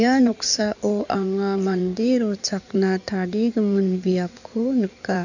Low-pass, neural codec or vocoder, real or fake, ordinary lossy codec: 7.2 kHz; codec, 16 kHz in and 24 kHz out, 2.2 kbps, FireRedTTS-2 codec; fake; none